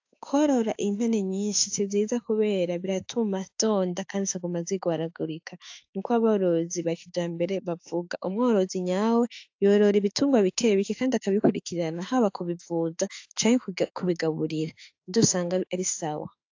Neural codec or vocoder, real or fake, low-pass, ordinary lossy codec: autoencoder, 48 kHz, 32 numbers a frame, DAC-VAE, trained on Japanese speech; fake; 7.2 kHz; AAC, 48 kbps